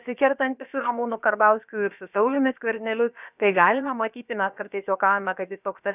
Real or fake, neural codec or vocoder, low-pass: fake; codec, 16 kHz, about 1 kbps, DyCAST, with the encoder's durations; 3.6 kHz